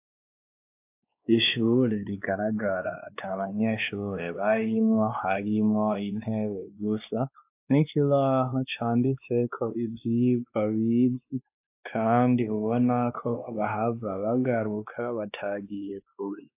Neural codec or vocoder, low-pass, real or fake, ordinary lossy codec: codec, 16 kHz, 2 kbps, X-Codec, WavLM features, trained on Multilingual LibriSpeech; 3.6 kHz; fake; AAC, 32 kbps